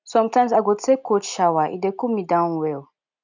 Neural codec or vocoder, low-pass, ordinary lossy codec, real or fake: none; 7.2 kHz; none; real